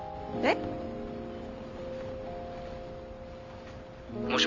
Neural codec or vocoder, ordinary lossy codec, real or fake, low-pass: none; Opus, 32 kbps; real; 7.2 kHz